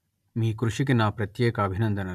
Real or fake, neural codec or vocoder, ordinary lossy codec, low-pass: real; none; none; 14.4 kHz